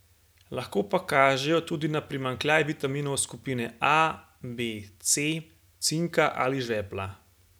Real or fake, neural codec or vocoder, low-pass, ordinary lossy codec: real; none; none; none